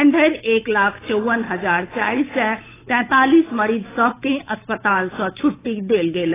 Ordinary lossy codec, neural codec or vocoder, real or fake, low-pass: AAC, 16 kbps; codec, 16 kHz, 8 kbps, FunCodec, trained on Chinese and English, 25 frames a second; fake; 3.6 kHz